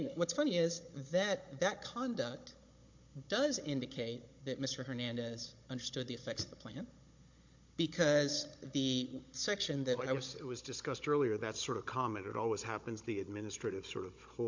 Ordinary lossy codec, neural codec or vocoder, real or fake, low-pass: MP3, 48 kbps; codec, 16 kHz, 16 kbps, FunCodec, trained on Chinese and English, 50 frames a second; fake; 7.2 kHz